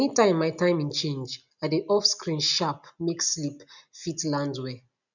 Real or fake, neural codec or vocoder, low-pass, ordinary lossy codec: real; none; 7.2 kHz; none